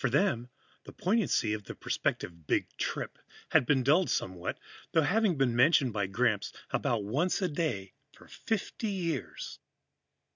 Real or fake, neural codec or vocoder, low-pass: real; none; 7.2 kHz